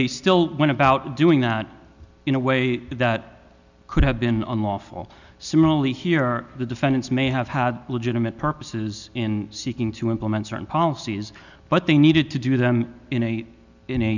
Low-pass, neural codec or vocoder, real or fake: 7.2 kHz; none; real